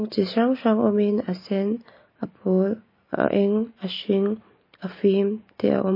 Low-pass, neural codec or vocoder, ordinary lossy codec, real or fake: 5.4 kHz; none; MP3, 24 kbps; real